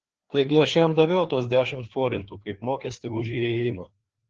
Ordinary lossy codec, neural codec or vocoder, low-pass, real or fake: Opus, 16 kbps; codec, 16 kHz, 2 kbps, FreqCodec, larger model; 7.2 kHz; fake